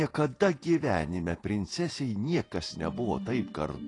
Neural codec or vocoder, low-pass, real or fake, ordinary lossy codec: vocoder, 48 kHz, 128 mel bands, Vocos; 10.8 kHz; fake; AAC, 32 kbps